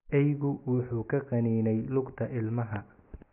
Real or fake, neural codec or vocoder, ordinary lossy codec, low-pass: real; none; none; 3.6 kHz